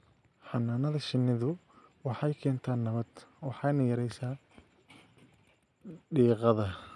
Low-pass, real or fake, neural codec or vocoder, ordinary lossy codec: none; real; none; none